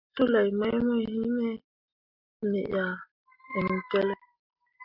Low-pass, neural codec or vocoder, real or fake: 5.4 kHz; none; real